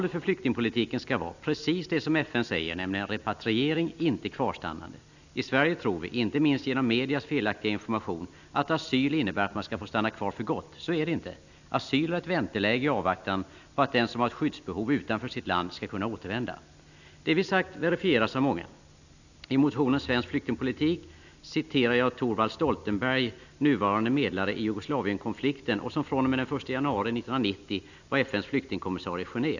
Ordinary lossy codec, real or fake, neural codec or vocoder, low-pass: none; real; none; 7.2 kHz